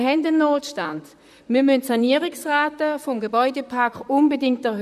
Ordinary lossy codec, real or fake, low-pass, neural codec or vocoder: none; fake; 14.4 kHz; vocoder, 44.1 kHz, 128 mel bands, Pupu-Vocoder